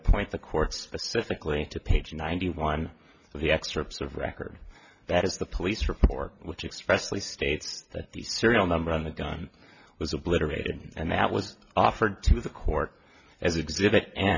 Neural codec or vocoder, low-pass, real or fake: none; 7.2 kHz; real